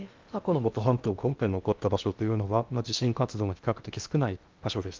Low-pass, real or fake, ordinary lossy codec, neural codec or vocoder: 7.2 kHz; fake; Opus, 32 kbps; codec, 16 kHz in and 24 kHz out, 0.6 kbps, FocalCodec, streaming, 2048 codes